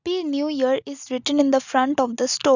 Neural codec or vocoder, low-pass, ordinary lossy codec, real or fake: none; 7.2 kHz; none; real